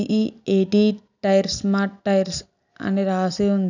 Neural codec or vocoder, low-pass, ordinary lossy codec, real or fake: none; 7.2 kHz; none; real